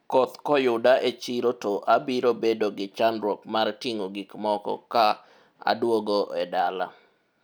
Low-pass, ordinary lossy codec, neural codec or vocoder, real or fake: none; none; none; real